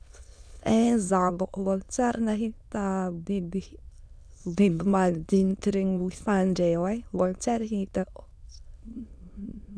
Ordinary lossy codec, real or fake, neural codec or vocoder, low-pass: none; fake; autoencoder, 22.05 kHz, a latent of 192 numbers a frame, VITS, trained on many speakers; none